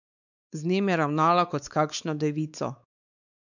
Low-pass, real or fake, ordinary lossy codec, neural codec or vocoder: 7.2 kHz; fake; none; codec, 16 kHz, 4 kbps, X-Codec, WavLM features, trained on Multilingual LibriSpeech